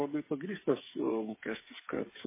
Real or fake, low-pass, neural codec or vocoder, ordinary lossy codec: fake; 3.6 kHz; codec, 16 kHz, 8 kbps, FreqCodec, smaller model; MP3, 16 kbps